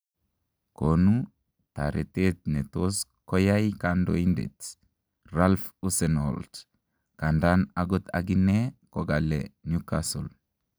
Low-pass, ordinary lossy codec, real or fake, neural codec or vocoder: none; none; real; none